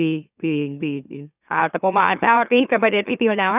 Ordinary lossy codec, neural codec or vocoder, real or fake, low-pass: none; autoencoder, 44.1 kHz, a latent of 192 numbers a frame, MeloTTS; fake; 3.6 kHz